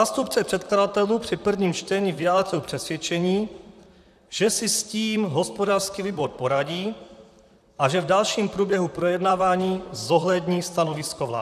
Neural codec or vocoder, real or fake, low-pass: vocoder, 44.1 kHz, 128 mel bands, Pupu-Vocoder; fake; 14.4 kHz